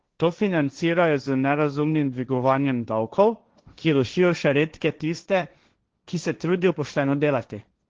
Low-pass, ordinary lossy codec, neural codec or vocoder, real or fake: 7.2 kHz; Opus, 16 kbps; codec, 16 kHz, 1.1 kbps, Voila-Tokenizer; fake